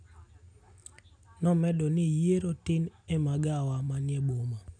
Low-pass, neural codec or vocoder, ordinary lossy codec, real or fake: 9.9 kHz; none; none; real